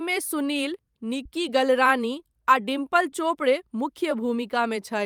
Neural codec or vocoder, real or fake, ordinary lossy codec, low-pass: vocoder, 44.1 kHz, 128 mel bands every 512 samples, BigVGAN v2; fake; Opus, 32 kbps; 19.8 kHz